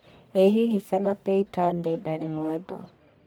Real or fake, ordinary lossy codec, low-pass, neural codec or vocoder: fake; none; none; codec, 44.1 kHz, 1.7 kbps, Pupu-Codec